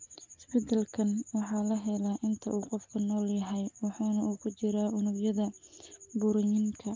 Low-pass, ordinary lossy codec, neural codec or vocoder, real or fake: 7.2 kHz; Opus, 24 kbps; none; real